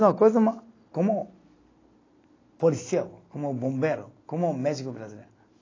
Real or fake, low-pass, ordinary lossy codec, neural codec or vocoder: real; 7.2 kHz; AAC, 32 kbps; none